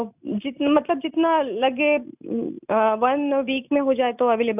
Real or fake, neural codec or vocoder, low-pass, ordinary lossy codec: real; none; 3.6 kHz; none